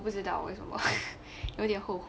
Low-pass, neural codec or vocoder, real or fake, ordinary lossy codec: none; none; real; none